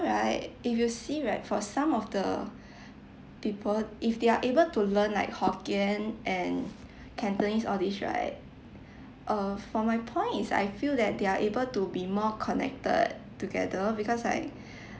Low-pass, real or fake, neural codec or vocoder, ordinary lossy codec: none; real; none; none